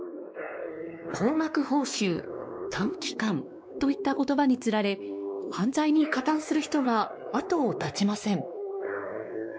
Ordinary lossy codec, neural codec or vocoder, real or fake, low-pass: none; codec, 16 kHz, 2 kbps, X-Codec, WavLM features, trained on Multilingual LibriSpeech; fake; none